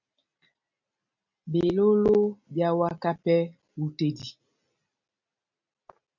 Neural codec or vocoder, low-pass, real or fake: none; 7.2 kHz; real